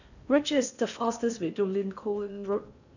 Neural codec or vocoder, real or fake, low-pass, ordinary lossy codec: codec, 16 kHz in and 24 kHz out, 0.8 kbps, FocalCodec, streaming, 65536 codes; fake; 7.2 kHz; none